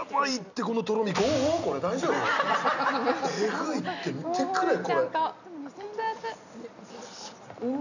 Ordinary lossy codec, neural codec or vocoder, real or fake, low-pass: none; none; real; 7.2 kHz